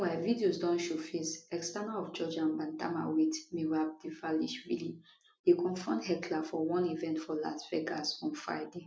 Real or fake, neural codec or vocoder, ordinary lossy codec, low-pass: real; none; none; none